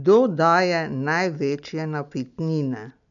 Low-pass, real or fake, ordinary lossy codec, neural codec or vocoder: 7.2 kHz; real; none; none